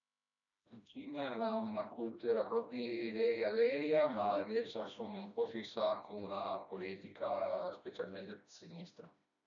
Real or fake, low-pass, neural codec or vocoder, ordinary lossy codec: fake; 7.2 kHz; codec, 16 kHz, 1 kbps, FreqCodec, smaller model; none